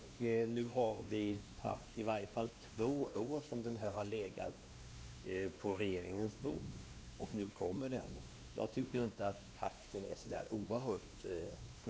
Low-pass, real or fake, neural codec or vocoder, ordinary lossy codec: none; fake; codec, 16 kHz, 2 kbps, X-Codec, WavLM features, trained on Multilingual LibriSpeech; none